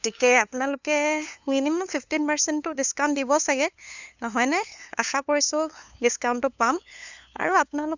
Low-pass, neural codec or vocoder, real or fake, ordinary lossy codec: 7.2 kHz; codec, 16 kHz, 2 kbps, FunCodec, trained on LibriTTS, 25 frames a second; fake; none